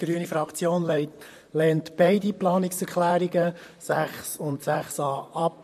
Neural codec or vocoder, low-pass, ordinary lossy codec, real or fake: vocoder, 44.1 kHz, 128 mel bands, Pupu-Vocoder; 14.4 kHz; MP3, 64 kbps; fake